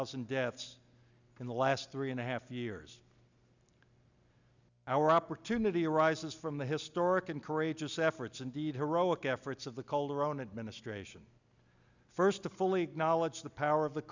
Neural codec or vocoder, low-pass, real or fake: none; 7.2 kHz; real